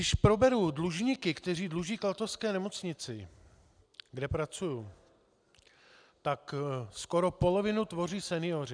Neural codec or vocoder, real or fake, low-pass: none; real; 9.9 kHz